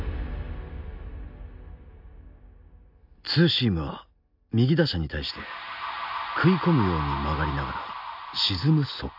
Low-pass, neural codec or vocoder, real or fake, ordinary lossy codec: 5.4 kHz; none; real; AAC, 48 kbps